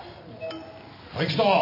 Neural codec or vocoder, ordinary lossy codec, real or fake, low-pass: none; none; real; 5.4 kHz